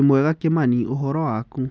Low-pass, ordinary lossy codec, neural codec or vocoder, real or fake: none; none; none; real